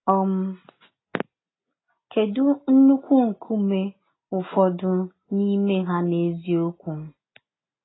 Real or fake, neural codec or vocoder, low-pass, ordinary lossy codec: real; none; 7.2 kHz; AAC, 16 kbps